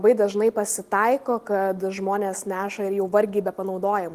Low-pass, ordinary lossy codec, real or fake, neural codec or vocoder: 14.4 kHz; Opus, 24 kbps; real; none